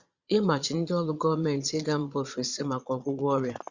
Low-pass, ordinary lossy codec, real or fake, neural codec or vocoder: 7.2 kHz; Opus, 64 kbps; fake; vocoder, 22.05 kHz, 80 mel bands, Vocos